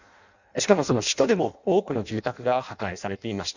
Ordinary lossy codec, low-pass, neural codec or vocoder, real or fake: none; 7.2 kHz; codec, 16 kHz in and 24 kHz out, 0.6 kbps, FireRedTTS-2 codec; fake